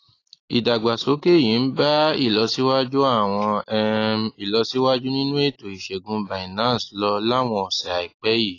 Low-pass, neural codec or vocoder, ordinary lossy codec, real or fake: 7.2 kHz; none; AAC, 32 kbps; real